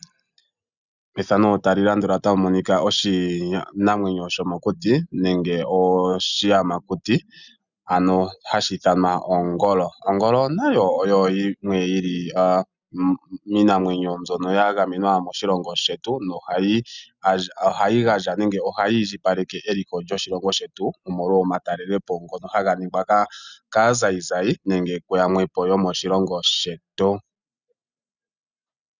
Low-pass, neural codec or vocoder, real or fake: 7.2 kHz; none; real